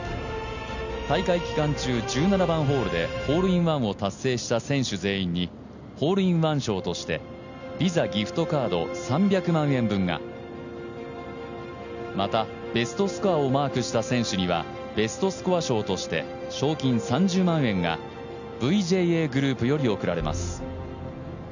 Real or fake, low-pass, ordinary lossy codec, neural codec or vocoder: real; 7.2 kHz; none; none